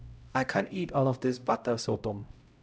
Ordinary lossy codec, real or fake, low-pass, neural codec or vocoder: none; fake; none; codec, 16 kHz, 0.5 kbps, X-Codec, HuBERT features, trained on LibriSpeech